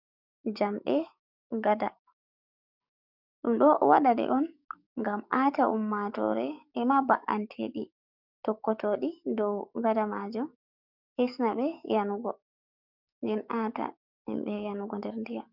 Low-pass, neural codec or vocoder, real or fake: 5.4 kHz; codec, 44.1 kHz, 7.8 kbps, DAC; fake